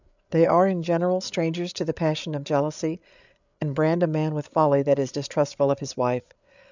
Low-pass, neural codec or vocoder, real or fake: 7.2 kHz; codec, 16 kHz, 8 kbps, FreqCodec, larger model; fake